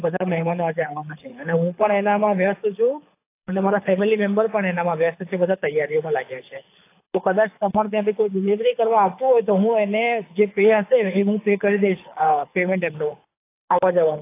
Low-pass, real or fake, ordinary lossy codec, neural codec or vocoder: 3.6 kHz; fake; AAC, 24 kbps; codec, 24 kHz, 6 kbps, HILCodec